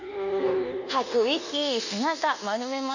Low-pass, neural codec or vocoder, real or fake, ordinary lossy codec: 7.2 kHz; codec, 24 kHz, 1.2 kbps, DualCodec; fake; none